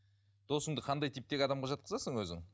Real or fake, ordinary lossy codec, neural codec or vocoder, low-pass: real; none; none; none